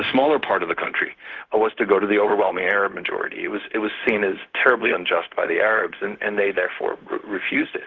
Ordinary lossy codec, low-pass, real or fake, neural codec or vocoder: Opus, 16 kbps; 7.2 kHz; fake; codec, 16 kHz, 0.4 kbps, LongCat-Audio-Codec